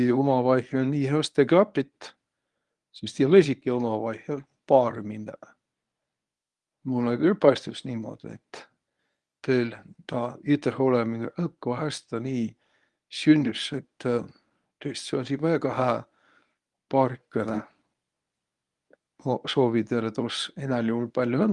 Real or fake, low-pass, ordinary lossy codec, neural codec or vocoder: fake; 10.8 kHz; Opus, 32 kbps; codec, 24 kHz, 0.9 kbps, WavTokenizer, medium speech release version 1